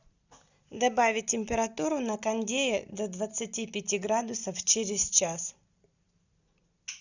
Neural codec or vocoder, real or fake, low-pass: none; real; 7.2 kHz